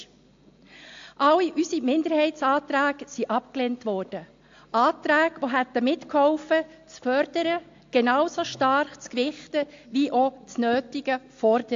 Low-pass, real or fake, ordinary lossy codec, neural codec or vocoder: 7.2 kHz; real; AAC, 48 kbps; none